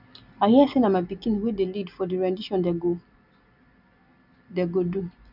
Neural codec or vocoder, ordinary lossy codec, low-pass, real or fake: none; none; 5.4 kHz; real